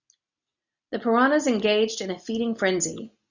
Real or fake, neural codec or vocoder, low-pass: real; none; 7.2 kHz